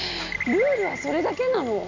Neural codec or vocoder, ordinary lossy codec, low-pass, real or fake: none; none; 7.2 kHz; real